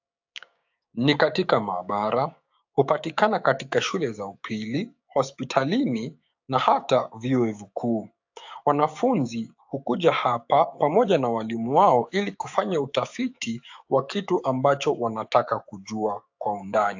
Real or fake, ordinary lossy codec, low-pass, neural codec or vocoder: fake; AAC, 48 kbps; 7.2 kHz; codec, 16 kHz, 6 kbps, DAC